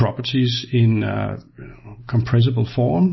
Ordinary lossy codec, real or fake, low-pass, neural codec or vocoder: MP3, 24 kbps; real; 7.2 kHz; none